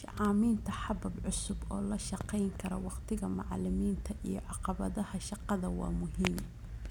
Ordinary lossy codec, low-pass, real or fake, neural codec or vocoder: none; 19.8 kHz; real; none